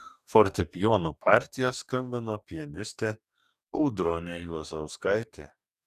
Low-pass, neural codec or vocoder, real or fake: 14.4 kHz; codec, 44.1 kHz, 2.6 kbps, DAC; fake